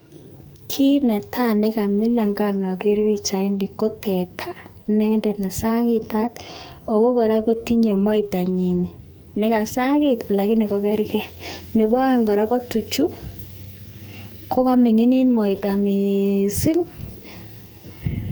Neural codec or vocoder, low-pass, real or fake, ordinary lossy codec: codec, 44.1 kHz, 2.6 kbps, SNAC; none; fake; none